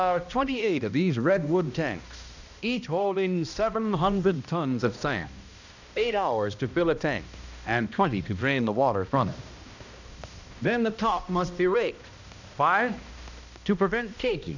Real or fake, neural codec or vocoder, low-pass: fake; codec, 16 kHz, 1 kbps, X-Codec, HuBERT features, trained on balanced general audio; 7.2 kHz